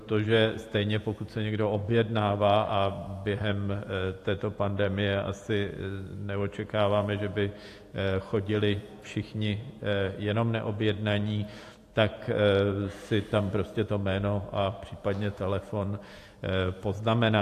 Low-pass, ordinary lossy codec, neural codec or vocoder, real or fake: 14.4 kHz; AAC, 64 kbps; vocoder, 48 kHz, 128 mel bands, Vocos; fake